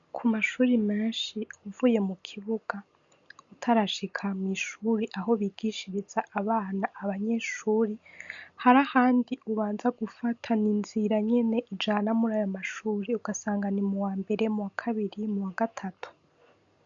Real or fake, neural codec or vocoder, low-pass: real; none; 7.2 kHz